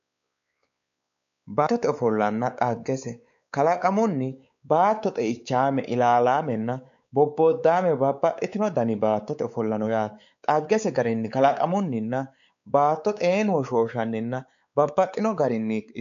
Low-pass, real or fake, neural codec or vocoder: 7.2 kHz; fake; codec, 16 kHz, 4 kbps, X-Codec, WavLM features, trained on Multilingual LibriSpeech